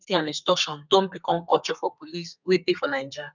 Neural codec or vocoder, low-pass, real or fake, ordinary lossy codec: codec, 32 kHz, 1.9 kbps, SNAC; 7.2 kHz; fake; none